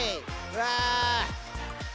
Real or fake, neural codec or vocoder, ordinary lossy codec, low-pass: real; none; none; none